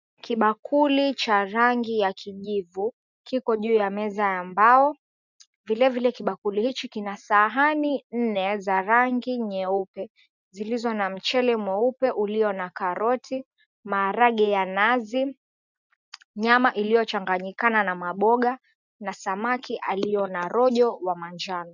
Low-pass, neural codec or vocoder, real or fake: 7.2 kHz; none; real